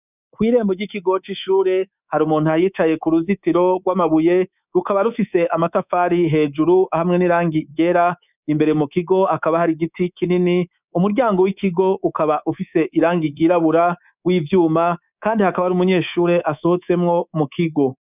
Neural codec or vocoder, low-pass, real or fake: codec, 24 kHz, 3.1 kbps, DualCodec; 3.6 kHz; fake